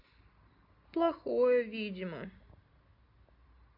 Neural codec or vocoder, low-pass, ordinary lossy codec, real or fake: none; 5.4 kHz; AAC, 32 kbps; real